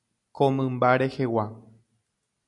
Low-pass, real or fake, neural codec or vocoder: 10.8 kHz; real; none